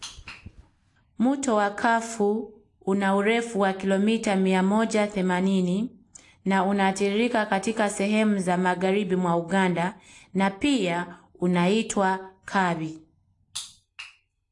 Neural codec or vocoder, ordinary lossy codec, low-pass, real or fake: none; AAC, 48 kbps; 10.8 kHz; real